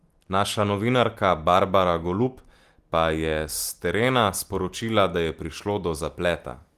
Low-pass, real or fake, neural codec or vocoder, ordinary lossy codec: 14.4 kHz; real; none; Opus, 24 kbps